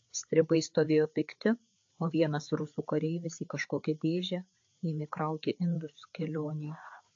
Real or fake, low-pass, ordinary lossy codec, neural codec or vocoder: fake; 7.2 kHz; AAC, 48 kbps; codec, 16 kHz, 4 kbps, FreqCodec, larger model